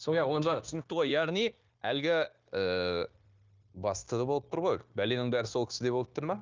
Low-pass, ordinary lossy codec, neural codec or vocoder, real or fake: 7.2 kHz; Opus, 24 kbps; codec, 16 kHz, 0.9 kbps, LongCat-Audio-Codec; fake